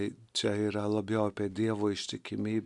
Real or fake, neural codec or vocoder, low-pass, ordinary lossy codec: real; none; 10.8 kHz; MP3, 64 kbps